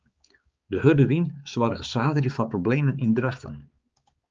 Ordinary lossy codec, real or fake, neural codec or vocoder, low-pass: Opus, 24 kbps; fake; codec, 16 kHz, 4 kbps, X-Codec, HuBERT features, trained on balanced general audio; 7.2 kHz